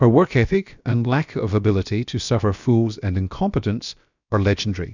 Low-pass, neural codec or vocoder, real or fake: 7.2 kHz; codec, 16 kHz, about 1 kbps, DyCAST, with the encoder's durations; fake